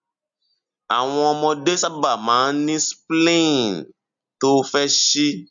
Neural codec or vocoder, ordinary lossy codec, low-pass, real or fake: none; none; 7.2 kHz; real